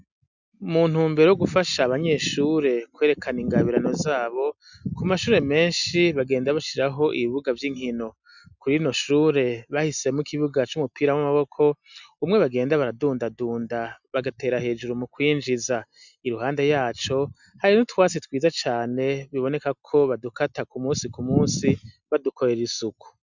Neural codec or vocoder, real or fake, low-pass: none; real; 7.2 kHz